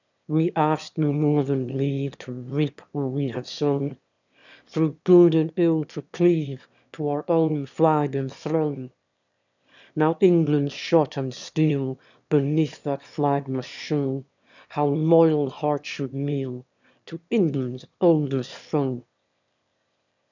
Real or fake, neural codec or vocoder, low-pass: fake; autoencoder, 22.05 kHz, a latent of 192 numbers a frame, VITS, trained on one speaker; 7.2 kHz